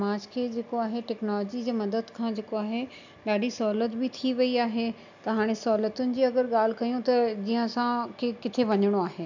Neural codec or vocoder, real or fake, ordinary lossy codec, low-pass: none; real; none; 7.2 kHz